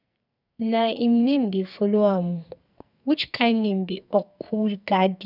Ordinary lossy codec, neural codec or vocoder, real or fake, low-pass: none; codec, 44.1 kHz, 2.6 kbps, SNAC; fake; 5.4 kHz